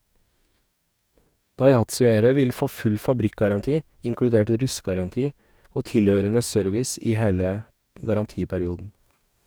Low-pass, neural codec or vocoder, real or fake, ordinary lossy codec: none; codec, 44.1 kHz, 2.6 kbps, DAC; fake; none